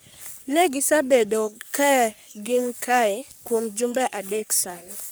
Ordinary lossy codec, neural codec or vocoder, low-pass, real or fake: none; codec, 44.1 kHz, 3.4 kbps, Pupu-Codec; none; fake